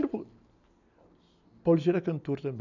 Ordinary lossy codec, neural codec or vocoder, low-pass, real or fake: none; none; 7.2 kHz; real